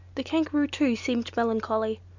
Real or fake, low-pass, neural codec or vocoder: real; 7.2 kHz; none